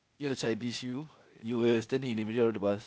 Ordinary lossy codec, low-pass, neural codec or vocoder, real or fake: none; none; codec, 16 kHz, 0.8 kbps, ZipCodec; fake